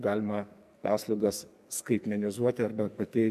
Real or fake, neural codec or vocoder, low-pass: fake; codec, 44.1 kHz, 2.6 kbps, SNAC; 14.4 kHz